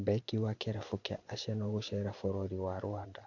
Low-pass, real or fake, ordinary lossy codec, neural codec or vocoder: 7.2 kHz; fake; none; vocoder, 44.1 kHz, 128 mel bands every 512 samples, BigVGAN v2